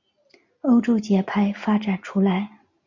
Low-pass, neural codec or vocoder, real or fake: 7.2 kHz; none; real